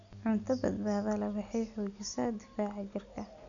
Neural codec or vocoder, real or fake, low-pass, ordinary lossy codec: none; real; 7.2 kHz; none